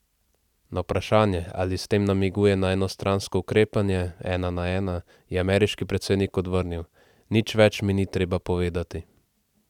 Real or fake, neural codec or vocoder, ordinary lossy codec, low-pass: real; none; none; 19.8 kHz